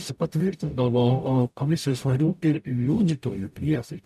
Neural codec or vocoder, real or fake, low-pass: codec, 44.1 kHz, 0.9 kbps, DAC; fake; 14.4 kHz